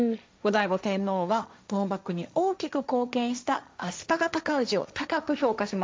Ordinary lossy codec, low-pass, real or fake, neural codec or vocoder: none; none; fake; codec, 16 kHz, 1.1 kbps, Voila-Tokenizer